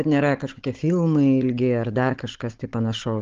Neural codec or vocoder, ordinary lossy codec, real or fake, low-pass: codec, 16 kHz, 16 kbps, FunCodec, trained on Chinese and English, 50 frames a second; Opus, 16 kbps; fake; 7.2 kHz